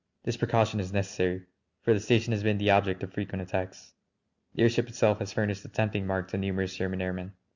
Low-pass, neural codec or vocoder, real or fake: 7.2 kHz; none; real